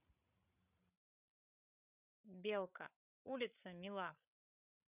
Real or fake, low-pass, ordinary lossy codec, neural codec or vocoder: fake; 3.6 kHz; none; codec, 16 kHz, 16 kbps, FreqCodec, larger model